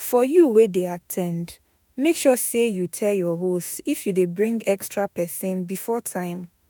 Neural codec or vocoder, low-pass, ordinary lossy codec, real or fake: autoencoder, 48 kHz, 32 numbers a frame, DAC-VAE, trained on Japanese speech; none; none; fake